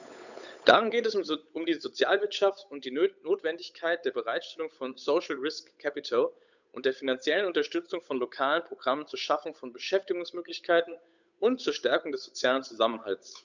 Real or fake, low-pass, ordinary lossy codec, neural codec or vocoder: fake; 7.2 kHz; none; codec, 16 kHz, 8 kbps, FunCodec, trained on Chinese and English, 25 frames a second